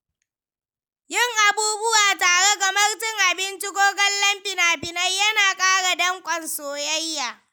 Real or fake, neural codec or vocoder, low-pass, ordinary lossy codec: real; none; none; none